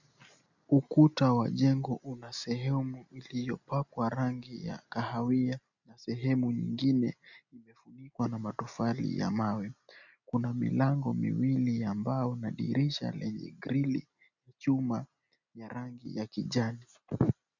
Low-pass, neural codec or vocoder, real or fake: 7.2 kHz; none; real